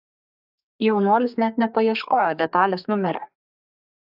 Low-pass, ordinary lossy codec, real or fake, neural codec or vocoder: 5.4 kHz; AAC, 48 kbps; fake; codec, 32 kHz, 1.9 kbps, SNAC